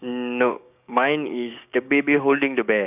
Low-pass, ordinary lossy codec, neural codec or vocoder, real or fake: 3.6 kHz; none; codec, 16 kHz, 6 kbps, DAC; fake